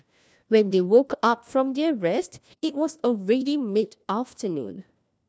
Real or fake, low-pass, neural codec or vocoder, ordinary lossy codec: fake; none; codec, 16 kHz, 1 kbps, FunCodec, trained on LibriTTS, 50 frames a second; none